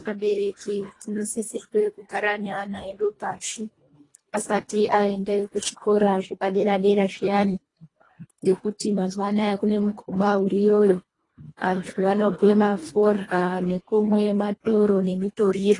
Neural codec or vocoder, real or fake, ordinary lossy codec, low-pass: codec, 24 kHz, 1.5 kbps, HILCodec; fake; AAC, 32 kbps; 10.8 kHz